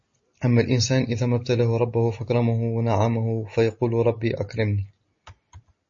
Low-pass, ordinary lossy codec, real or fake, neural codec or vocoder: 7.2 kHz; MP3, 32 kbps; real; none